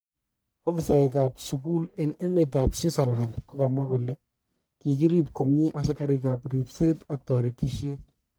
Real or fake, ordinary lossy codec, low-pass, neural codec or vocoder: fake; none; none; codec, 44.1 kHz, 1.7 kbps, Pupu-Codec